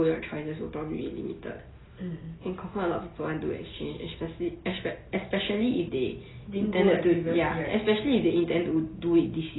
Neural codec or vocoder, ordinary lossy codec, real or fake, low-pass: none; AAC, 16 kbps; real; 7.2 kHz